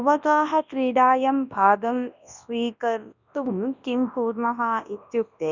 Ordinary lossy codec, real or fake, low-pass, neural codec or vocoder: none; fake; 7.2 kHz; codec, 24 kHz, 0.9 kbps, WavTokenizer, large speech release